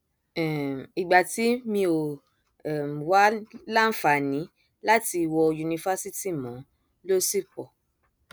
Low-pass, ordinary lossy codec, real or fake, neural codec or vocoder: none; none; real; none